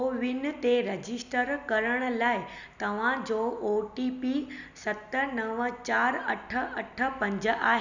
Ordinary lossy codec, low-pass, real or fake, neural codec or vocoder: none; 7.2 kHz; real; none